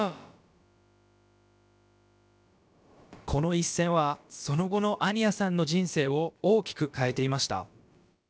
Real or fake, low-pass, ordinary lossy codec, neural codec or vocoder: fake; none; none; codec, 16 kHz, about 1 kbps, DyCAST, with the encoder's durations